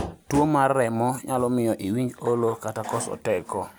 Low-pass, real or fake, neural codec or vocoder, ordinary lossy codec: none; real; none; none